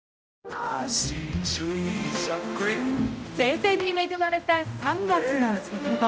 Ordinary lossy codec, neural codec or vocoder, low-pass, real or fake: none; codec, 16 kHz, 0.5 kbps, X-Codec, HuBERT features, trained on general audio; none; fake